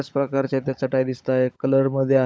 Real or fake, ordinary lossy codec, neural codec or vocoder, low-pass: fake; none; codec, 16 kHz, 16 kbps, FunCodec, trained on LibriTTS, 50 frames a second; none